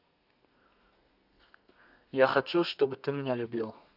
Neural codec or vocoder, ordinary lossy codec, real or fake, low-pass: codec, 32 kHz, 1.9 kbps, SNAC; none; fake; 5.4 kHz